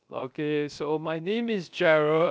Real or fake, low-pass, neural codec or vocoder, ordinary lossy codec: fake; none; codec, 16 kHz, 0.3 kbps, FocalCodec; none